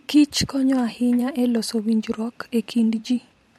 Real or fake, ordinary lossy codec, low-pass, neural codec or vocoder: fake; MP3, 64 kbps; 19.8 kHz; vocoder, 44.1 kHz, 128 mel bands every 512 samples, BigVGAN v2